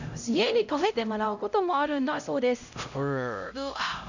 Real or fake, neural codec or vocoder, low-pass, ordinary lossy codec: fake; codec, 16 kHz, 0.5 kbps, X-Codec, HuBERT features, trained on LibriSpeech; 7.2 kHz; none